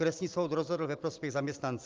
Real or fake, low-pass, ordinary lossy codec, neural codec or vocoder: real; 7.2 kHz; Opus, 32 kbps; none